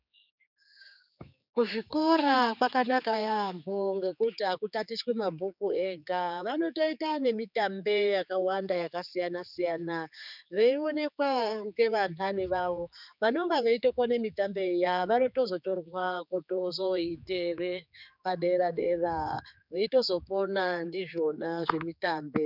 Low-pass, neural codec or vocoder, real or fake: 5.4 kHz; codec, 16 kHz, 4 kbps, X-Codec, HuBERT features, trained on general audio; fake